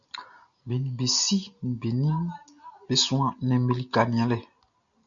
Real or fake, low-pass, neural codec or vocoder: real; 7.2 kHz; none